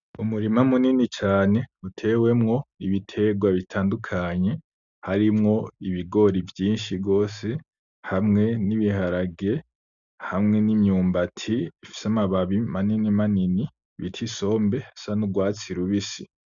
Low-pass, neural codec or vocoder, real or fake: 7.2 kHz; none; real